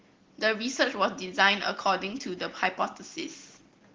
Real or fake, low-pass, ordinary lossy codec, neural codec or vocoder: real; 7.2 kHz; Opus, 16 kbps; none